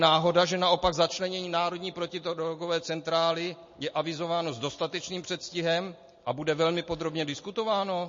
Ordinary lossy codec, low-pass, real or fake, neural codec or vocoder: MP3, 32 kbps; 7.2 kHz; real; none